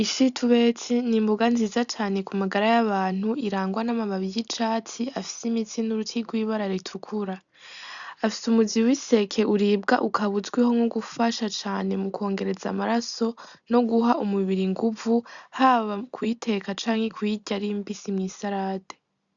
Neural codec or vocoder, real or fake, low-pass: none; real; 7.2 kHz